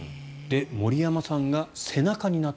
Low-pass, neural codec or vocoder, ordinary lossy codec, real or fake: none; none; none; real